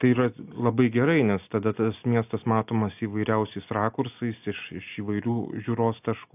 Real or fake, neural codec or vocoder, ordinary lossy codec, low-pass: real; none; AAC, 32 kbps; 3.6 kHz